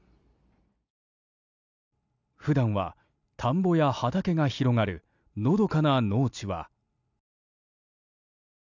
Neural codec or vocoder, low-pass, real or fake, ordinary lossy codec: none; 7.2 kHz; real; none